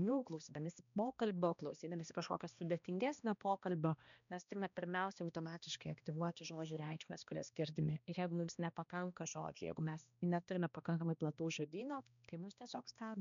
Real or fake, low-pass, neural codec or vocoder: fake; 7.2 kHz; codec, 16 kHz, 1 kbps, X-Codec, HuBERT features, trained on balanced general audio